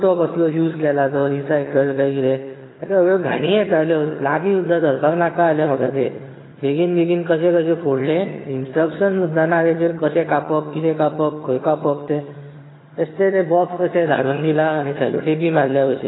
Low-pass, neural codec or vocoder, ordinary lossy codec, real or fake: 7.2 kHz; vocoder, 22.05 kHz, 80 mel bands, HiFi-GAN; AAC, 16 kbps; fake